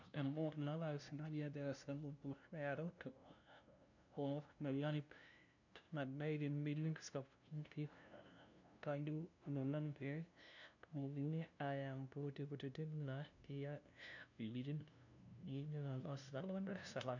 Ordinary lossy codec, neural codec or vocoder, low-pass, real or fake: none; codec, 16 kHz, 0.5 kbps, FunCodec, trained on LibriTTS, 25 frames a second; 7.2 kHz; fake